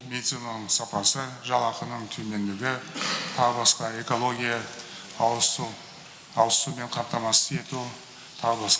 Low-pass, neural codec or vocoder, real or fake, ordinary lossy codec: none; none; real; none